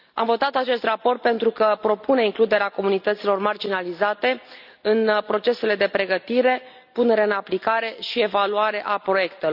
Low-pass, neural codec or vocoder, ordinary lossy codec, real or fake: 5.4 kHz; none; none; real